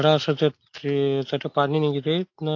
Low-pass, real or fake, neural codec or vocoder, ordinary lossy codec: 7.2 kHz; real; none; AAC, 48 kbps